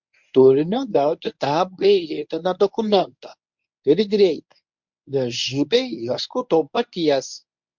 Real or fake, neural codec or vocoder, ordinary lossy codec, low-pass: fake; codec, 24 kHz, 0.9 kbps, WavTokenizer, medium speech release version 1; MP3, 64 kbps; 7.2 kHz